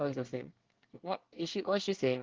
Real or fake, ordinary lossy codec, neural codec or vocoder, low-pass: fake; Opus, 16 kbps; codec, 24 kHz, 1 kbps, SNAC; 7.2 kHz